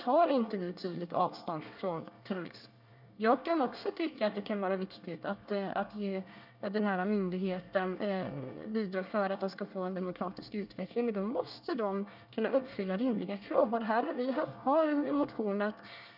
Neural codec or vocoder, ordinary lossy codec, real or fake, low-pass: codec, 24 kHz, 1 kbps, SNAC; none; fake; 5.4 kHz